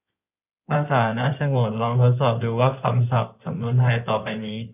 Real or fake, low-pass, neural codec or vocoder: fake; 3.6 kHz; codec, 16 kHz in and 24 kHz out, 2.2 kbps, FireRedTTS-2 codec